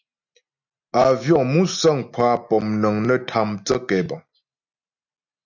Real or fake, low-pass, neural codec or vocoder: real; 7.2 kHz; none